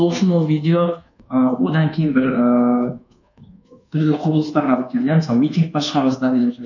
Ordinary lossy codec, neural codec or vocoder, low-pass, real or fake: none; codec, 24 kHz, 1.2 kbps, DualCodec; 7.2 kHz; fake